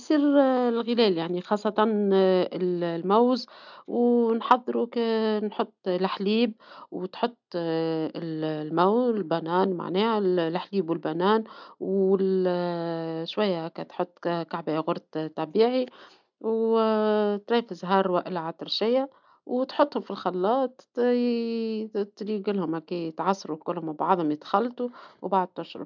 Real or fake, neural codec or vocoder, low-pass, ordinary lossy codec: real; none; 7.2 kHz; none